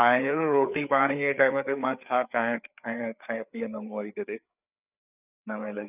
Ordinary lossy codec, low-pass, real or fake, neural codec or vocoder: none; 3.6 kHz; fake; codec, 16 kHz, 4 kbps, FreqCodec, larger model